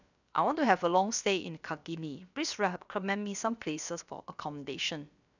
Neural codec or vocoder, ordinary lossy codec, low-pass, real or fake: codec, 16 kHz, about 1 kbps, DyCAST, with the encoder's durations; none; 7.2 kHz; fake